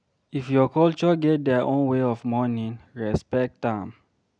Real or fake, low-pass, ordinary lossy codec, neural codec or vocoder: real; 9.9 kHz; none; none